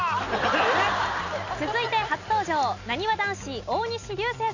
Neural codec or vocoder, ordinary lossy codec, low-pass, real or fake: none; none; 7.2 kHz; real